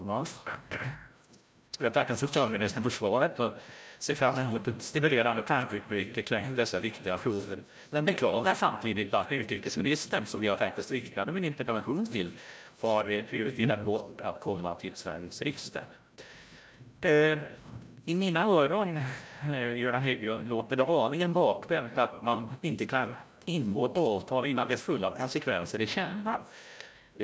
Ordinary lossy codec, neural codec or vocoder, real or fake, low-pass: none; codec, 16 kHz, 0.5 kbps, FreqCodec, larger model; fake; none